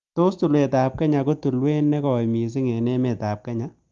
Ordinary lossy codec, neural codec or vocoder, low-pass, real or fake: Opus, 32 kbps; none; 7.2 kHz; real